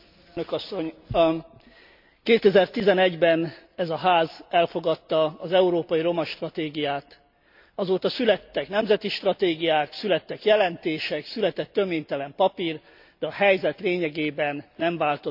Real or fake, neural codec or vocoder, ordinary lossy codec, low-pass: real; none; MP3, 48 kbps; 5.4 kHz